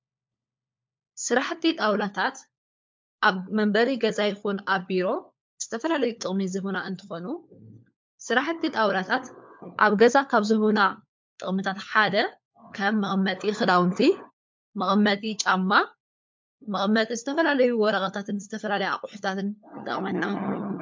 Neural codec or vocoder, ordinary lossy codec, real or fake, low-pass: codec, 16 kHz, 4 kbps, FunCodec, trained on LibriTTS, 50 frames a second; MP3, 64 kbps; fake; 7.2 kHz